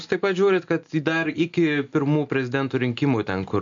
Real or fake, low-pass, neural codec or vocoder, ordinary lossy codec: real; 7.2 kHz; none; MP3, 48 kbps